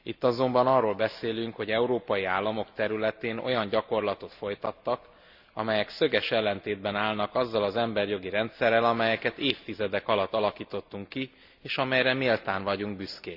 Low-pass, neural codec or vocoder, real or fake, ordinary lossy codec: 5.4 kHz; none; real; Opus, 64 kbps